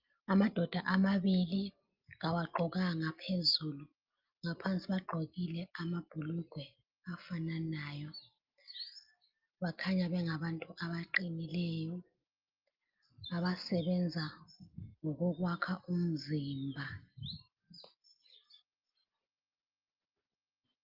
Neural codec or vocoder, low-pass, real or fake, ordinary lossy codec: none; 5.4 kHz; real; Opus, 24 kbps